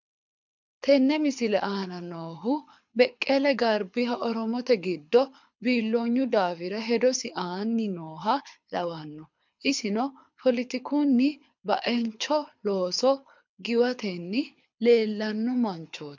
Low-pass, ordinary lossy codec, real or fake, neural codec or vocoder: 7.2 kHz; MP3, 64 kbps; fake; codec, 24 kHz, 6 kbps, HILCodec